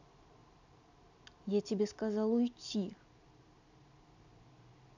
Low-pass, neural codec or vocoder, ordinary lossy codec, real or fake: 7.2 kHz; none; none; real